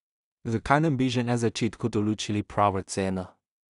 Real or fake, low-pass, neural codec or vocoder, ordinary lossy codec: fake; 10.8 kHz; codec, 16 kHz in and 24 kHz out, 0.4 kbps, LongCat-Audio-Codec, two codebook decoder; none